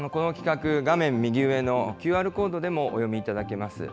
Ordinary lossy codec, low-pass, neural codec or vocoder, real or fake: none; none; none; real